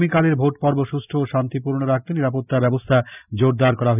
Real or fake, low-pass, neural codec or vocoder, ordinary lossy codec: real; 3.6 kHz; none; none